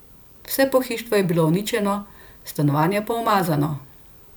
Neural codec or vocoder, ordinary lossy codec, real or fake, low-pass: vocoder, 44.1 kHz, 128 mel bands every 512 samples, BigVGAN v2; none; fake; none